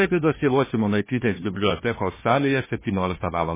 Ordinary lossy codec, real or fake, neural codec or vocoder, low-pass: MP3, 16 kbps; fake; codec, 16 kHz, 1 kbps, FunCodec, trained on Chinese and English, 50 frames a second; 3.6 kHz